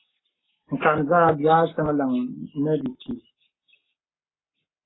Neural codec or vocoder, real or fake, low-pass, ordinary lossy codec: codec, 44.1 kHz, 7.8 kbps, Pupu-Codec; fake; 7.2 kHz; AAC, 16 kbps